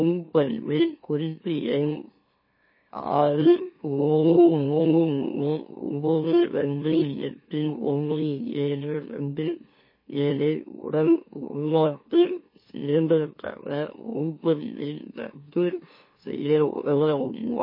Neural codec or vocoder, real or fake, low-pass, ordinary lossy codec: autoencoder, 44.1 kHz, a latent of 192 numbers a frame, MeloTTS; fake; 5.4 kHz; MP3, 24 kbps